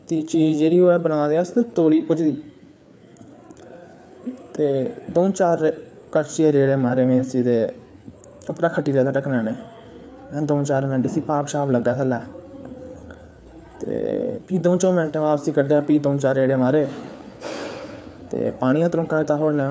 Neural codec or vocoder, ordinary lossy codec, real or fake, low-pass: codec, 16 kHz, 4 kbps, FreqCodec, larger model; none; fake; none